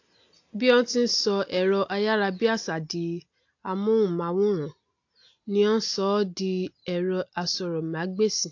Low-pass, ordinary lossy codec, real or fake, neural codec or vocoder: 7.2 kHz; AAC, 48 kbps; real; none